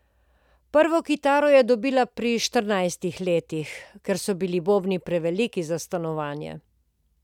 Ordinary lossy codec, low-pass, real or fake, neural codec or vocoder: none; 19.8 kHz; real; none